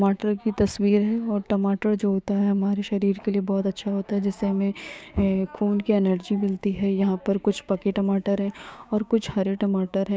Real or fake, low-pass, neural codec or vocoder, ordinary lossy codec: fake; none; codec, 16 kHz, 6 kbps, DAC; none